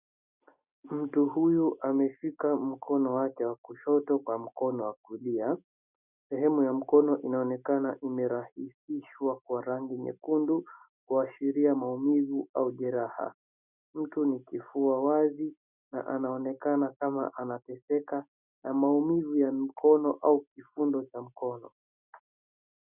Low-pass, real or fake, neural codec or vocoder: 3.6 kHz; real; none